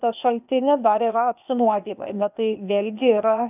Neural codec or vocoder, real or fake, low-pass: codec, 16 kHz, 0.8 kbps, ZipCodec; fake; 3.6 kHz